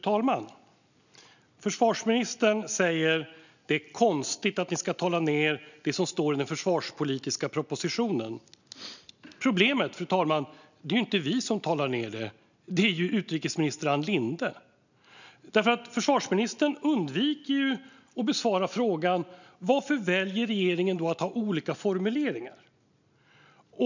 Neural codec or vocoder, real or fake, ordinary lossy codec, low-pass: none; real; none; 7.2 kHz